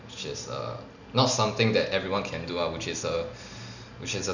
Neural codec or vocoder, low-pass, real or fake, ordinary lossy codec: none; 7.2 kHz; real; none